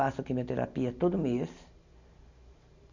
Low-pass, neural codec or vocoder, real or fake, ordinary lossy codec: 7.2 kHz; none; real; none